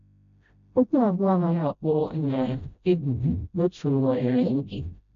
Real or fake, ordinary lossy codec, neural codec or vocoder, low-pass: fake; none; codec, 16 kHz, 0.5 kbps, FreqCodec, smaller model; 7.2 kHz